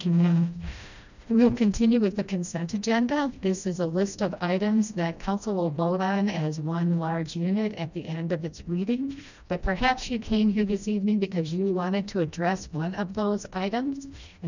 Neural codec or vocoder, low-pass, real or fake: codec, 16 kHz, 1 kbps, FreqCodec, smaller model; 7.2 kHz; fake